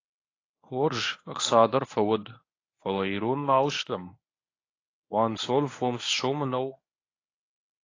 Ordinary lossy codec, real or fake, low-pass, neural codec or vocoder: AAC, 32 kbps; fake; 7.2 kHz; codec, 24 kHz, 0.9 kbps, WavTokenizer, medium speech release version 1